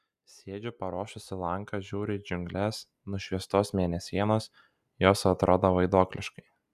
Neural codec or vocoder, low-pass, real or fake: none; 14.4 kHz; real